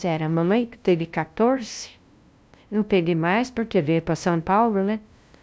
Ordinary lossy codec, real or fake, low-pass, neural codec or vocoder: none; fake; none; codec, 16 kHz, 0.5 kbps, FunCodec, trained on LibriTTS, 25 frames a second